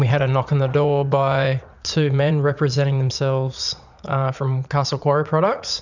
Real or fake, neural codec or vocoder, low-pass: fake; vocoder, 44.1 kHz, 80 mel bands, Vocos; 7.2 kHz